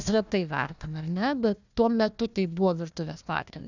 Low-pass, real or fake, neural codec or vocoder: 7.2 kHz; fake; codec, 16 kHz, 1 kbps, FunCodec, trained on Chinese and English, 50 frames a second